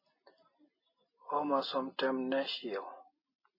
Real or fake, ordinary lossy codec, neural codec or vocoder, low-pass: real; MP3, 24 kbps; none; 5.4 kHz